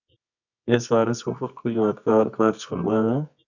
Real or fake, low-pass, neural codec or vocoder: fake; 7.2 kHz; codec, 24 kHz, 0.9 kbps, WavTokenizer, medium music audio release